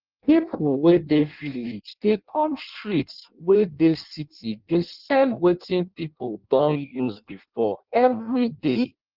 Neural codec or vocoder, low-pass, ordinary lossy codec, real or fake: codec, 16 kHz in and 24 kHz out, 0.6 kbps, FireRedTTS-2 codec; 5.4 kHz; Opus, 32 kbps; fake